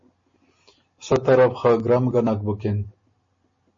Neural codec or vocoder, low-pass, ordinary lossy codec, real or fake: none; 7.2 kHz; MP3, 32 kbps; real